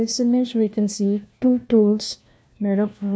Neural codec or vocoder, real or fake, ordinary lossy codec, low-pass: codec, 16 kHz, 1 kbps, FunCodec, trained on LibriTTS, 50 frames a second; fake; none; none